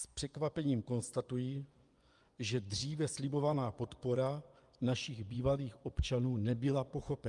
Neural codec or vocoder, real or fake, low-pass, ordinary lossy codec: vocoder, 24 kHz, 100 mel bands, Vocos; fake; 10.8 kHz; Opus, 32 kbps